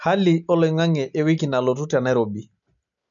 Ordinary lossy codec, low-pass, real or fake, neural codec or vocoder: none; 7.2 kHz; real; none